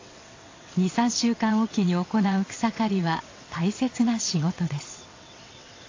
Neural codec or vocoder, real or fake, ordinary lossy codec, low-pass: vocoder, 22.05 kHz, 80 mel bands, WaveNeXt; fake; AAC, 32 kbps; 7.2 kHz